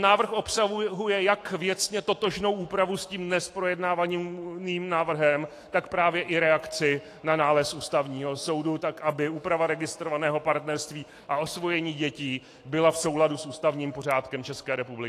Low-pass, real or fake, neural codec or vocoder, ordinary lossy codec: 14.4 kHz; fake; autoencoder, 48 kHz, 128 numbers a frame, DAC-VAE, trained on Japanese speech; AAC, 48 kbps